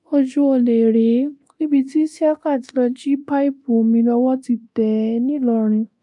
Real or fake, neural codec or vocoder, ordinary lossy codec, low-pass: fake; codec, 24 kHz, 0.9 kbps, DualCodec; AAC, 64 kbps; 10.8 kHz